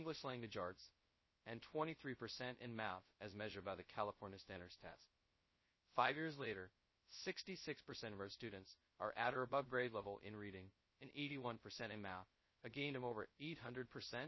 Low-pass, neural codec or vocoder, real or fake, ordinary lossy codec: 7.2 kHz; codec, 16 kHz, 0.2 kbps, FocalCodec; fake; MP3, 24 kbps